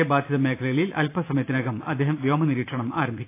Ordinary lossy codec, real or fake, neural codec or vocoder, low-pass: AAC, 24 kbps; fake; vocoder, 44.1 kHz, 128 mel bands every 512 samples, BigVGAN v2; 3.6 kHz